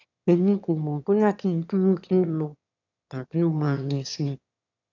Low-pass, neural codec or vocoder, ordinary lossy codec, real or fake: 7.2 kHz; autoencoder, 22.05 kHz, a latent of 192 numbers a frame, VITS, trained on one speaker; none; fake